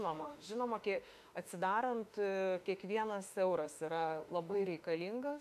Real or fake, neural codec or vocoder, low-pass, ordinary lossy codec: fake; autoencoder, 48 kHz, 32 numbers a frame, DAC-VAE, trained on Japanese speech; 14.4 kHz; AAC, 96 kbps